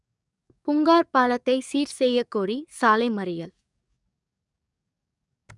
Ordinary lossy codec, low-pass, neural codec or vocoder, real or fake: none; 10.8 kHz; codec, 44.1 kHz, 7.8 kbps, DAC; fake